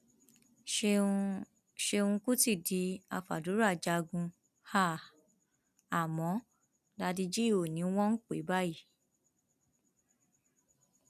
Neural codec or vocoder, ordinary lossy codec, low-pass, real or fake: none; none; 14.4 kHz; real